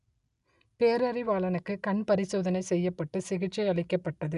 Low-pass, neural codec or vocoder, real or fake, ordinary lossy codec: 10.8 kHz; none; real; none